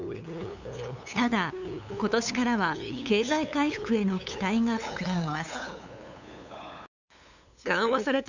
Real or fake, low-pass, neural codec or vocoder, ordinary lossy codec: fake; 7.2 kHz; codec, 16 kHz, 8 kbps, FunCodec, trained on LibriTTS, 25 frames a second; none